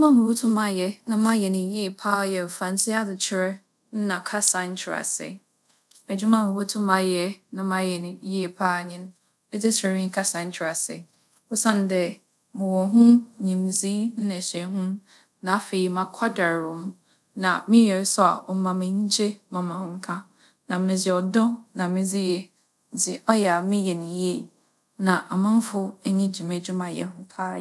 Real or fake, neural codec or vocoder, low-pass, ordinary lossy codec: fake; codec, 24 kHz, 0.5 kbps, DualCodec; none; none